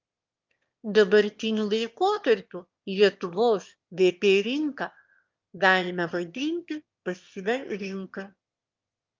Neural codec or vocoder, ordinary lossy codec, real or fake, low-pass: autoencoder, 22.05 kHz, a latent of 192 numbers a frame, VITS, trained on one speaker; Opus, 24 kbps; fake; 7.2 kHz